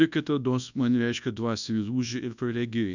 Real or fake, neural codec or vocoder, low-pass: fake; codec, 24 kHz, 0.9 kbps, WavTokenizer, large speech release; 7.2 kHz